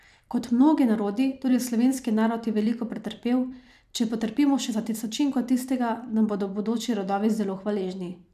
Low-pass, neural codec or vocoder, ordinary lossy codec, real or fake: 14.4 kHz; vocoder, 44.1 kHz, 128 mel bands every 256 samples, BigVGAN v2; none; fake